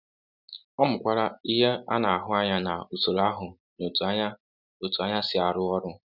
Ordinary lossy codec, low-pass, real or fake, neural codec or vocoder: none; 5.4 kHz; real; none